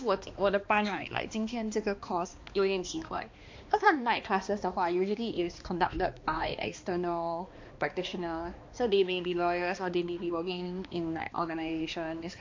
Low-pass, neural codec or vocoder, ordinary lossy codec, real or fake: 7.2 kHz; codec, 16 kHz, 2 kbps, X-Codec, HuBERT features, trained on balanced general audio; MP3, 48 kbps; fake